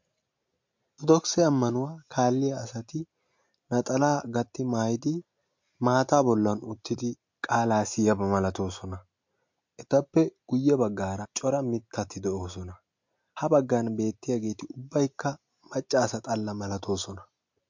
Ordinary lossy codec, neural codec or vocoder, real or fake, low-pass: MP3, 48 kbps; none; real; 7.2 kHz